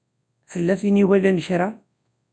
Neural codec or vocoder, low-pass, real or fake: codec, 24 kHz, 0.9 kbps, WavTokenizer, large speech release; 9.9 kHz; fake